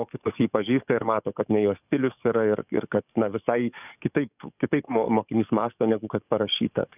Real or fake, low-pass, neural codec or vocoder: fake; 3.6 kHz; codec, 44.1 kHz, 7.8 kbps, DAC